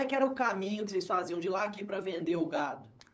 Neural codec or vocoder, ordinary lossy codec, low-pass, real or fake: codec, 16 kHz, 8 kbps, FunCodec, trained on LibriTTS, 25 frames a second; none; none; fake